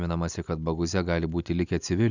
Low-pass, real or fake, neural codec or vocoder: 7.2 kHz; real; none